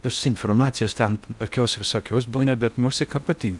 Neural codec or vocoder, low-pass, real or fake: codec, 16 kHz in and 24 kHz out, 0.6 kbps, FocalCodec, streaming, 4096 codes; 10.8 kHz; fake